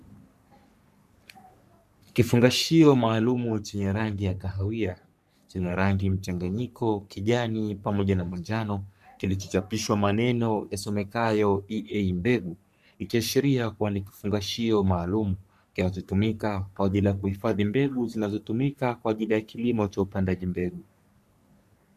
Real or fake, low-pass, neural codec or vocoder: fake; 14.4 kHz; codec, 44.1 kHz, 3.4 kbps, Pupu-Codec